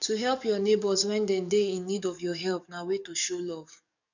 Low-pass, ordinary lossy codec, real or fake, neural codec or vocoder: 7.2 kHz; none; fake; codec, 44.1 kHz, 7.8 kbps, DAC